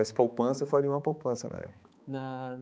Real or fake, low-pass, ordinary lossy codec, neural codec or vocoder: fake; none; none; codec, 16 kHz, 4 kbps, X-Codec, HuBERT features, trained on balanced general audio